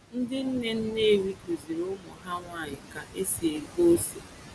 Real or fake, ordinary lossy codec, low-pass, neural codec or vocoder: real; none; none; none